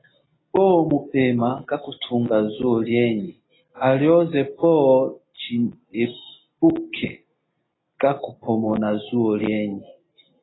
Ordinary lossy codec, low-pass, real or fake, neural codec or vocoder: AAC, 16 kbps; 7.2 kHz; real; none